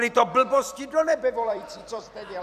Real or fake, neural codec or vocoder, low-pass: fake; vocoder, 44.1 kHz, 128 mel bands every 256 samples, BigVGAN v2; 14.4 kHz